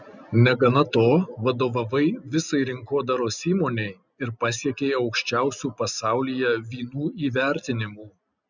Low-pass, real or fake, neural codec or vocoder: 7.2 kHz; real; none